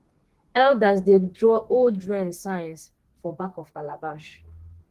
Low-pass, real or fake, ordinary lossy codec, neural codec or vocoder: 14.4 kHz; fake; Opus, 16 kbps; codec, 32 kHz, 1.9 kbps, SNAC